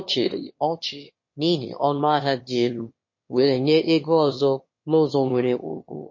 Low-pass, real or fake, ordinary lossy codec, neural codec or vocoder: 7.2 kHz; fake; MP3, 32 kbps; autoencoder, 22.05 kHz, a latent of 192 numbers a frame, VITS, trained on one speaker